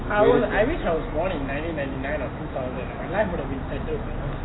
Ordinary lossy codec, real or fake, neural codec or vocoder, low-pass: AAC, 16 kbps; real; none; 7.2 kHz